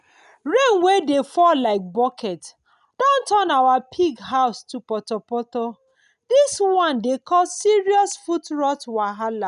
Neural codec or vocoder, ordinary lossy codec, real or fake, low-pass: none; none; real; 10.8 kHz